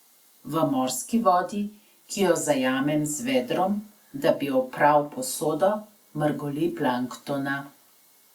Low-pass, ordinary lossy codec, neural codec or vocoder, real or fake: 19.8 kHz; Opus, 64 kbps; none; real